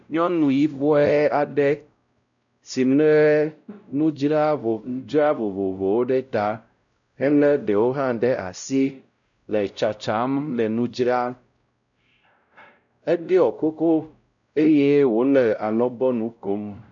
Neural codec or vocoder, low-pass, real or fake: codec, 16 kHz, 0.5 kbps, X-Codec, WavLM features, trained on Multilingual LibriSpeech; 7.2 kHz; fake